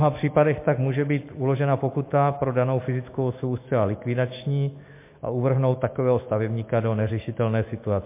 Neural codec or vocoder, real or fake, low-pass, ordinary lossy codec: autoencoder, 48 kHz, 128 numbers a frame, DAC-VAE, trained on Japanese speech; fake; 3.6 kHz; MP3, 24 kbps